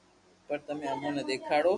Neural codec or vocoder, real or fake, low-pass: none; real; 10.8 kHz